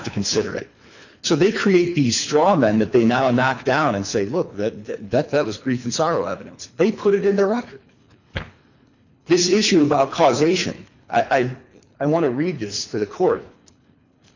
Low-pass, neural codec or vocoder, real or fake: 7.2 kHz; codec, 24 kHz, 3 kbps, HILCodec; fake